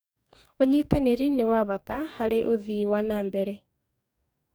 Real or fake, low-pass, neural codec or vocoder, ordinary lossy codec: fake; none; codec, 44.1 kHz, 2.6 kbps, DAC; none